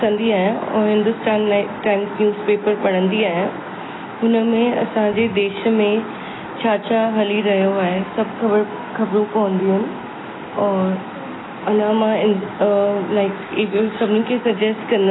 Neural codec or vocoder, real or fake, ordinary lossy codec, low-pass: none; real; AAC, 16 kbps; 7.2 kHz